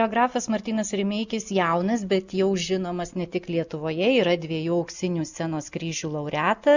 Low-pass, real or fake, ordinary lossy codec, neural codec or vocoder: 7.2 kHz; real; Opus, 64 kbps; none